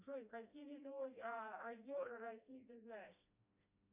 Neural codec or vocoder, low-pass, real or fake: codec, 16 kHz, 1 kbps, FreqCodec, smaller model; 3.6 kHz; fake